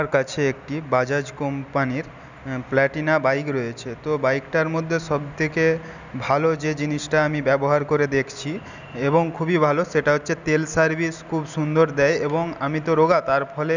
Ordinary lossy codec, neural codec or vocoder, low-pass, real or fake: none; none; 7.2 kHz; real